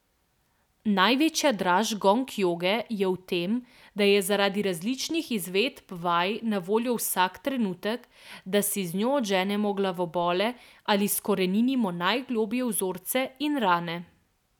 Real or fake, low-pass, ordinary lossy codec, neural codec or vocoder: real; 19.8 kHz; none; none